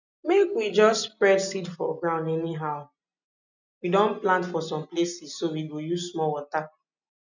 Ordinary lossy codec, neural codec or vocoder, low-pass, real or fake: none; none; 7.2 kHz; real